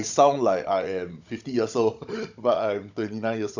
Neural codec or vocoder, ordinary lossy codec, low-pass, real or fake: codec, 16 kHz, 16 kbps, FunCodec, trained on Chinese and English, 50 frames a second; none; 7.2 kHz; fake